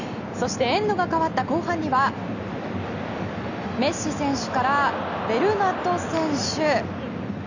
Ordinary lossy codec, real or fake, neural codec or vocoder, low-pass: none; real; none; 7.2 kHz